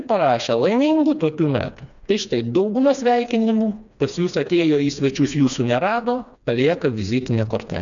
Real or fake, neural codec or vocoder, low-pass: fake; codec, 16 kHz, 2 kbps, FreqCodec, smaller model; 7.2 kHz